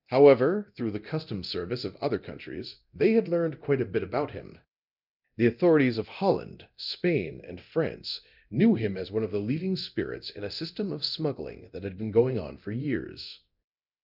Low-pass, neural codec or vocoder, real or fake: 5.4 kHz; codec, 24 kHz, 0.9 kbps, DualCodec; fake